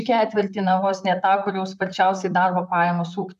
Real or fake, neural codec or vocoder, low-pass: fake; vocoder, 44.1 kHz, 128 mel bands, Pupu-Vocoder; 14.4 kHz